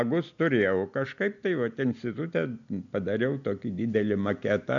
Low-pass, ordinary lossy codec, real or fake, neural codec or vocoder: 7.2 kHz; MP3, 96 kbps; real; none